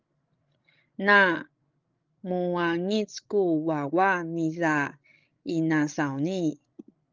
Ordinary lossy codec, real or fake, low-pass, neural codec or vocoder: Opus, 32 kbps; fake; 7.2 kHz; codec, 16 kHz, 16 kbps, FreqCodec, larger model